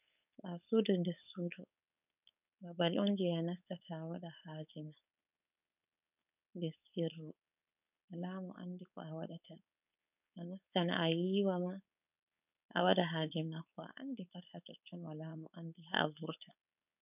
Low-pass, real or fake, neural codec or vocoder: 3.6 kHz; fake; codec, 16 kHz, 4.8 kbps, FACodec